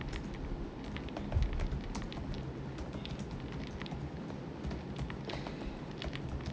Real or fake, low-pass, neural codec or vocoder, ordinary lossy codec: real; none; none; none